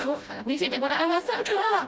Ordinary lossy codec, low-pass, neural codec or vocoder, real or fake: none; none; codec, 16 kHz, 0.5 kbps, FreqCodec, smaller model; fake